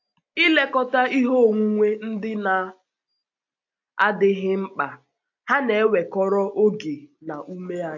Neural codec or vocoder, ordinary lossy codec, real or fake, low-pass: none; AAC, 48 kbps; real; 7.2 kHz